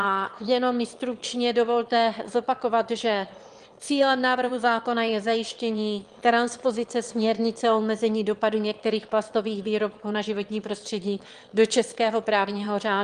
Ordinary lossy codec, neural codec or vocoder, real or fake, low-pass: Opus, 24 kbps; autoencoder, 22.05 kHz, a latent of 192 numbers a frame, VITS, trained on one speaker; fake; 9.9 kHz